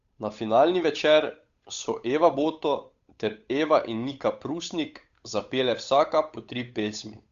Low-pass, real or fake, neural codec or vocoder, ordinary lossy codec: 7.2 kHz; fake; codec, 16 kHz, 8 kbps, FunCodec, trained on Chinese and English, 25 frames a second; Opus, 64 kbps